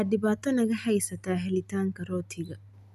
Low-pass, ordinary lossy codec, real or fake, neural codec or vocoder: 14.4 kHz; none; real; none